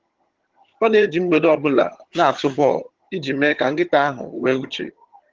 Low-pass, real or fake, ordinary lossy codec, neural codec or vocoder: 7.2 kHz; fake; Opus, 16 kbps; vocoder, 22.05 kHz, 80 mel bands, HiFi-GAN